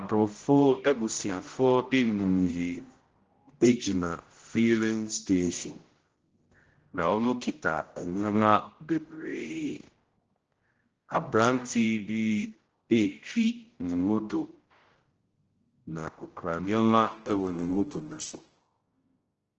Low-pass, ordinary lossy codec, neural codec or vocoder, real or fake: 7.2 kHz; Opus, 16 kbps; codec, 16 kHz, 0.5 kbps, X-Codec, HuBERT features, trained on general audio; fake